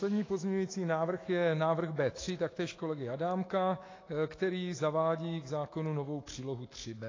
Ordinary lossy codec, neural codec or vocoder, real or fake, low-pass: AAC, 32 kbps; autoencoder, 48 kHz, 128 numbers a frame, DAC-VAE, trained on Japanese speech; fake; 7.2 kHz